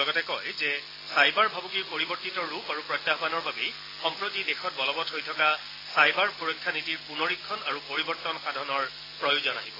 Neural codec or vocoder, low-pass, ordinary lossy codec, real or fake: none; 5.4 kHz; AAC, 24 kbps; real